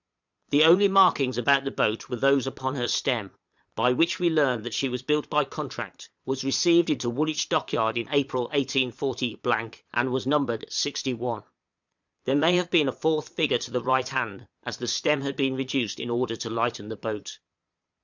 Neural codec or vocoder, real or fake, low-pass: vocoder, 22.05 kHz, 80 mel bands, Vocos; fake; 7.2 kHz